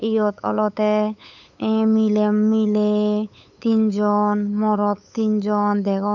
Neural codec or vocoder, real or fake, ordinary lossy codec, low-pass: codec, 16 kHz, 8 kbps, FunCodec, trained on LibriTTS, 25 frames a second; fake; none; 7.2 kHz